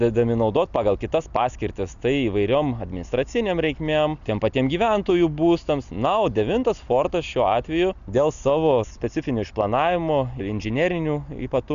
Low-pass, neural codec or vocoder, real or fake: 7.2 kHz; none; real